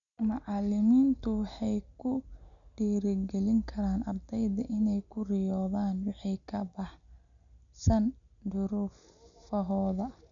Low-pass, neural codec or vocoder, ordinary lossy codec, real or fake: 7.2 kHz; none; none; real